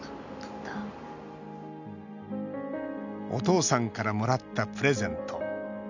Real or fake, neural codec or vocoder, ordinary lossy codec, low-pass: real; none; none; 7.2 kHz